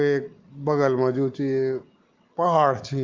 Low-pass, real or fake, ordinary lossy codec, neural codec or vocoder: 7.2 kHz; real; Opus, 32 kbps; none